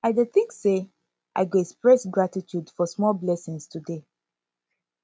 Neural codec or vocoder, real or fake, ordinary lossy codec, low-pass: none; real; none; none